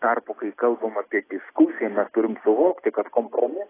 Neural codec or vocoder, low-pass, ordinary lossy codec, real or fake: none; 3.6 kHz; AAC, 16 kbps; real